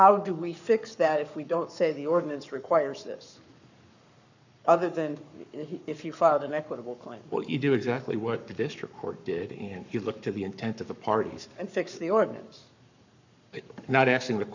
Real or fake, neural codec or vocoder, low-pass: fake; codec, 44.1 kHz, 7.8 kbps, Pupu-Codec; 7.2 kHz